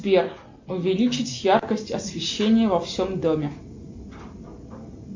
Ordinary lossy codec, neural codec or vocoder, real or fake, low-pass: MP3, 48 kbps; none; real; 7.2 kHz